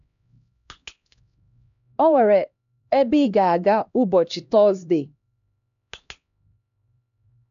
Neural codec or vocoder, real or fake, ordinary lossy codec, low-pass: codec, 16 kHz, 1 kbps, X-Codec, HuBERT features, trained on LibriSpeech; fake; none; 7.2 kHz